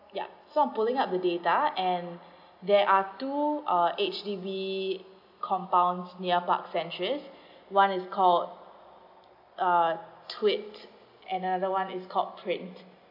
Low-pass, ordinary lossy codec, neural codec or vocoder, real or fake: 5.4 kHz; AAC, 48 kbps; none; real